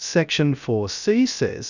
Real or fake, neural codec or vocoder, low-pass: fake; codec, 16 kHz, 0.3 kbps, FocalCodec; 7.2 kHz